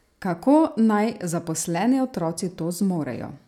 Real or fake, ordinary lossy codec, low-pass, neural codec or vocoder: real; none; 19.8 kHz; none